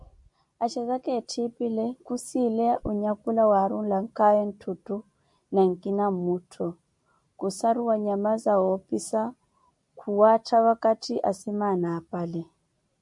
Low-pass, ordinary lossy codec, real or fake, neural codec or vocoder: 10.8 kHz; MP3, 96 kbps; real; none